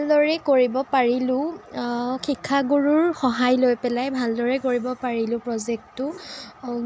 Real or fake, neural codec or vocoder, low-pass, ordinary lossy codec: real; none; none; none